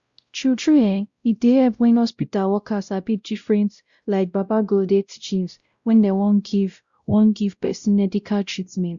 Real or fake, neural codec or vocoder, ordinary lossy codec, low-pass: fake; codec, 16 kHz, 0.5 kbps, X-Codec, WavLM features, trained on Multilingual LibriSpeech; Opus, 64 kbps; 7.2 kHz